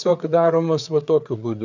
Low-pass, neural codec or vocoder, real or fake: 7.2 kHz; codec, 16 kHz, 4 kbps, FreqCodec, larger model; fake